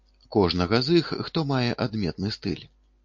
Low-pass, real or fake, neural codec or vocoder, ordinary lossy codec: 7.2 kHz; real; none; MP3, 48 kbps